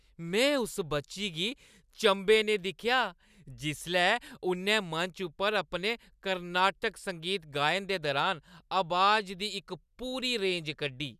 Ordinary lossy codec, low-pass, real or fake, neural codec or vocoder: none; 14.4 kHz; real; none